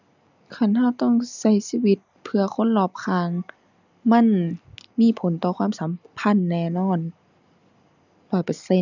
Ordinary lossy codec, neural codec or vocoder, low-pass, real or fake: none; none; 7.2 kHz; real